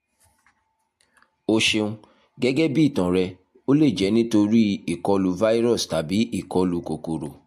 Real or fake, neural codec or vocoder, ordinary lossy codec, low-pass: real; none; MP3, 64 kbps; 14.4 kHz